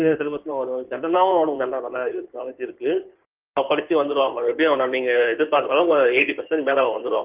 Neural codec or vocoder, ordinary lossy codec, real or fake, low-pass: codec, 16 kHz in and 24 kHz out, 2.2 kbps, FireRedTTS-2 codec; Opus, 32 kbps; fake; 3.6 kHz